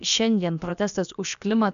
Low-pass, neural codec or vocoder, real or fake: 7.2 kHz; codec, 16 kHz, about 1 kbps, DyCAST, with the encoder's durations; fake